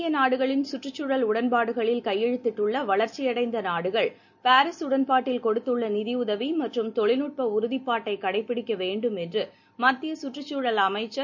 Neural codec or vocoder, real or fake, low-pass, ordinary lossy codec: none; real; 7.2 kHz; none